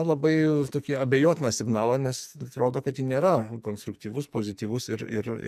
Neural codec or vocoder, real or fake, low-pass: codec, 44.1 kHz, 2.6 kbps, SNAC; fake; 14.4 kHz